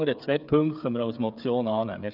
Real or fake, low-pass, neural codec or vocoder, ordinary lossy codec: fake; 5.4 kHz; codec, 16 kHz, 8 kbps, FreqCodec, smaller model; none